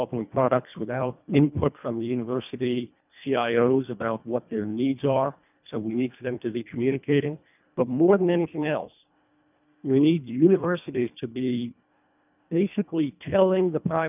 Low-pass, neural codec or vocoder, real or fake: 3.6 kHz; codec, 24 kHz, 1.5 kbps, HILCodec; fake